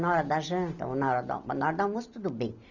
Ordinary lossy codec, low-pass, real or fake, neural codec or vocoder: none; 7.2 kHz; real; none